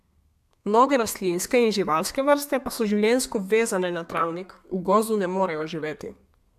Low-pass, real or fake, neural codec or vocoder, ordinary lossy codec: 14.4 kHz; fake; codec, 32 kHz, 1.9 kbps, SNAC; AAC, 96 kbps